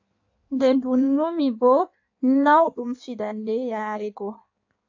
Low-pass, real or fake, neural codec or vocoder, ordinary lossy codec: 7.2 kHz; fake; codec, 16 kHz in and 24 kHz out, 1.1 kbps, FireRedTTS-2 codec; AAC, 48 kbps